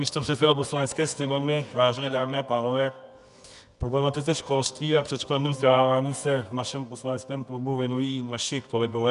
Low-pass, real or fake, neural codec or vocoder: 10.8 kHz; fake; codec, 24 kHz, 0.9 kbps, WavTokenizer, medium music audio release